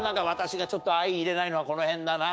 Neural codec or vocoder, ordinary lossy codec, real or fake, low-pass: codec, 16 kHz, 6 kbps, DAC; none; fake; none